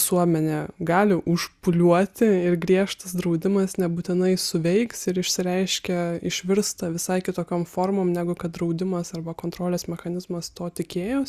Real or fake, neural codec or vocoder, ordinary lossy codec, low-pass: real; none; Opus, 64 kbps; 14.4 kHz